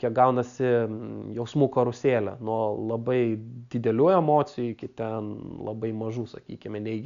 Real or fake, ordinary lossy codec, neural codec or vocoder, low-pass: real; MP3, 96 kbps; none; 7.2 kHz